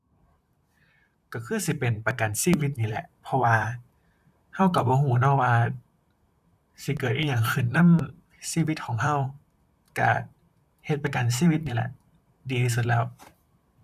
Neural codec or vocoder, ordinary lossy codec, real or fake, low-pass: vocoder, 44.1 kHz, 128 mel bands, Pupu-Vocoder; none; fake; 14.4 kHz